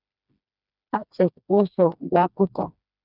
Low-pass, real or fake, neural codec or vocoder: 5.4 kHz; fake; codec, 16 kHz, 2 kbps, FreqCodec, smaller model